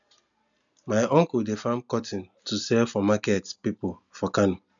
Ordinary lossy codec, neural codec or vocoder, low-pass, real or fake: none; none; 7.2 kHz; real